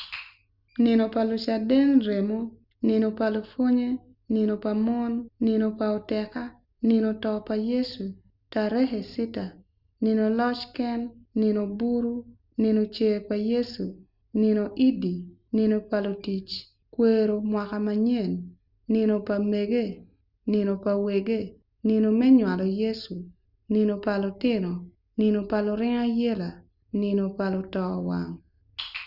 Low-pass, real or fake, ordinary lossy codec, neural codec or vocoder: 5.4 kHz; real; Opus, 64 kbps; none